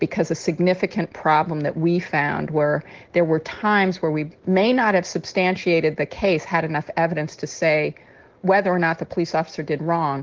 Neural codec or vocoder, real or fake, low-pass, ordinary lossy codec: none; real; 7.2 kHz; Opus, 16 kbps